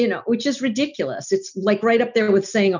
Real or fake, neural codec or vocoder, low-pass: real; none; 7.2 kHz